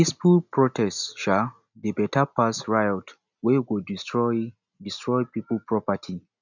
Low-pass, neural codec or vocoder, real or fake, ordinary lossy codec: 7.2 kHz; none; real; none